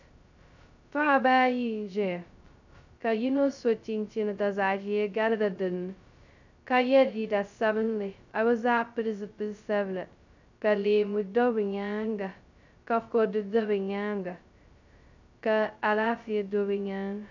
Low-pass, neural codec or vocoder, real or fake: 7.2 kHz; codec, 16 kHz, 0.2 kbps, FocalCodec; fake